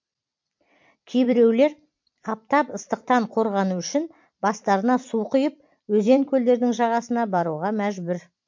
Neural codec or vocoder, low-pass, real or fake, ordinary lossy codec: vocoder, 44.1 kHz, 128 mel bands every 256 samples, BigVGAN v2; 7.2 kHz; fake; MP3, 48 kbps